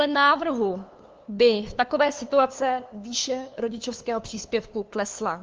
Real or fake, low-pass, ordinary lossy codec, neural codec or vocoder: fake; 7.2 kHz; Opus, 32 kbps; codec, 16 kHz, 2 kbps, FunCodec, trained on LibriTTS, 25 frames a second